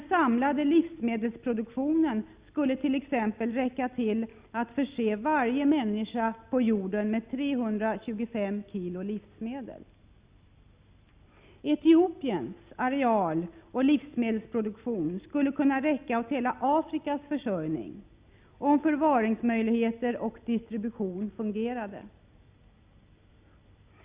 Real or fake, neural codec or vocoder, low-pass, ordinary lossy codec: real; none; 3.6 kHz; Opus, 64 kbps